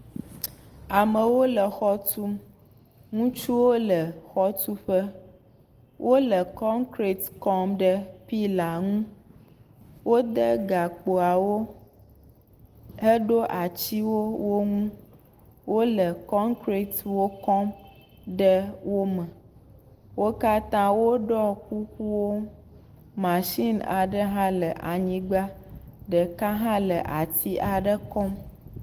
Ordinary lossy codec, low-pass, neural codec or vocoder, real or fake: Opus, 24 kbps; 14.4 kHz; none; real